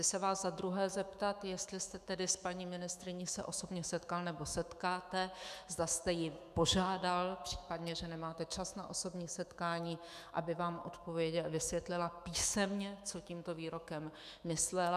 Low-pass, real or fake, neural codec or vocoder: 14.4 kHz; fake; autoencoder, 48 kHz, 128 numbers a frame, DAC-VAE, trained on Japanese speech